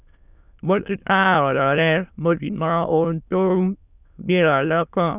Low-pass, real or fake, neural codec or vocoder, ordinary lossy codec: 3.6 kHz; fake; autoencoder, 22.05 kHz, a latent of 192 numbers a frame, VITS, trained on many speakers; none